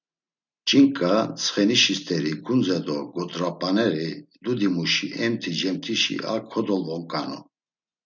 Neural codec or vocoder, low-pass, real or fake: none; 7.2 kHz; real